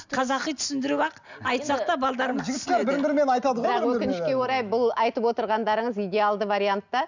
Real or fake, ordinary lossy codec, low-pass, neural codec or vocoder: real; none; 7.2 kHz; none